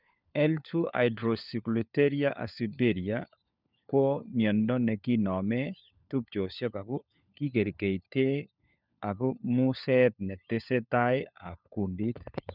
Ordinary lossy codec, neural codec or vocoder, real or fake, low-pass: none; codec, 16 kHz, 4 kbps, FunCodec, trained on LibriTTS, 50 frames a second; fake; 5.4 kHz